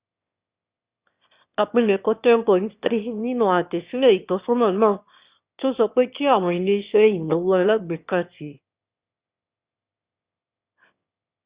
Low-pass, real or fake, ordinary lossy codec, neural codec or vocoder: 3.6 kHz; fake; Opus, 64 kbps; autoencoder, 22.05 kHz, a latent of 192 numbers a frame, VITS, trained on one speaker